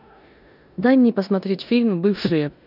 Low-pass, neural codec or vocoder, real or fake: 5.4 kHz; codec, 16 kHz in and 24 kHz out, 0.9 kbps, LongCat-Audio-Codec, four codebook decoder; fake